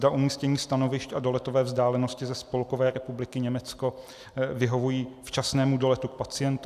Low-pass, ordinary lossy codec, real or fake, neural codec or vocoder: 14.4 kHz; AAC, 96 kbps; real; none